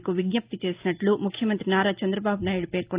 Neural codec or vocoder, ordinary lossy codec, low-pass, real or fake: none; Opus, 32 kbps; 3.6 kHz; real